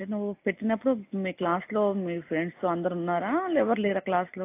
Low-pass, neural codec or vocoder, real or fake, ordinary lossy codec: 3.6 kHz; none; real; AAC, 24 kbps